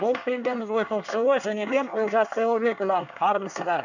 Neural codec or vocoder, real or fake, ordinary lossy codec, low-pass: codec, 24 kHz, 1 kbps, SNAC; fake; none; 7.2 kHz